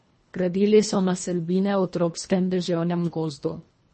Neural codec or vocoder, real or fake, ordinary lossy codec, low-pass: codec, 24 kHz, 1.5 kbps, HILCodec; fake; MP3, 32 kbps; 10.8 kHz